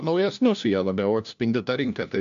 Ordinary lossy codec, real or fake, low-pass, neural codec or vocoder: MP3, 64 kbps; fake; 7.2 kHz; codec, 16 kHz, 1 kbps, FunCodec, trained on LibriTTS, 50 frames a second